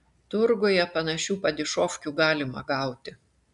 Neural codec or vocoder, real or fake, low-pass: none; real; 10.8 kHz